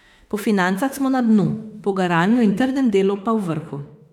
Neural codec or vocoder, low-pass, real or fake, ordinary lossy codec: autoencoder, 48 kHz, 32 numbers a frame, DAC-VAE, trained on Japanese speech; 19.8 kHz; fake; none